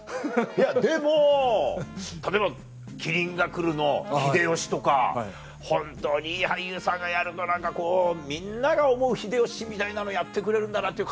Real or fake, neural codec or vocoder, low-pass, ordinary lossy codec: real; none; none; none